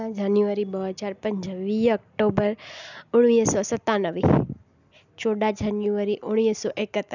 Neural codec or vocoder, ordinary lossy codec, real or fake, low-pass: none; none; real; 7.2 kHz